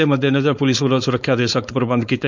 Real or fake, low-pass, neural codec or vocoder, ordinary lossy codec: fake; 7.2 kHz; codec, 16 kHz, 4.8 kbps, FACodec; none